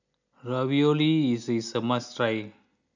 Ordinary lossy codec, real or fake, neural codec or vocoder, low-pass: none; real; none; 7.2 kHz